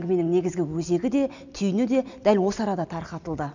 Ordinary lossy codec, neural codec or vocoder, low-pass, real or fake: none; none; 7.2 kHz; real